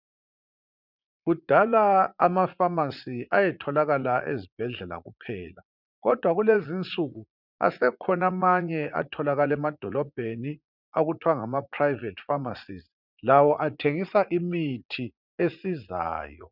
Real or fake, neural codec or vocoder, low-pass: fake; vocoder, 44.1 kHz, 80 mel bands, Vocos; 5.4 kHz